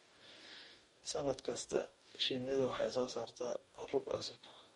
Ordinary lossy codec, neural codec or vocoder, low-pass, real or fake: MP3, 48 kbps; codec, 44.1 kHz, 2.6 kbps, DAC; 19.8 kHz; fake